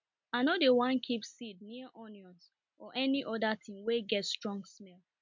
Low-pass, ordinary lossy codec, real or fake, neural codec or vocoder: 7.2 kHz; MP3, 64 kbps; real; none